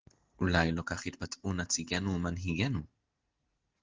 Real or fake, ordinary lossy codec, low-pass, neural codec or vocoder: real; Opus, 16 kbps; 7.2 kHz; none